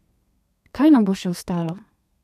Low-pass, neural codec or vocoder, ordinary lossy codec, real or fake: 14.4 kHz; codec, 32 kHz, 1.9 kbps, SNAC; none; fake